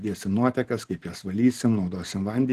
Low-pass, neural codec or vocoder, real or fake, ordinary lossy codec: 14.4 kHz; vocoder, 44.1 kHz, 128 mel bands every 512 samples, BigVGAN v2; fake; Opus, 16 kbps